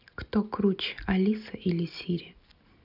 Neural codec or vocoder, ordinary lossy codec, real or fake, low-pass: none; none; real; 5.4 kHz